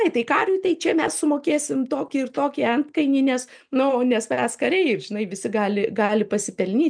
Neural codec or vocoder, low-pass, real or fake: none; 9.9 kHz; real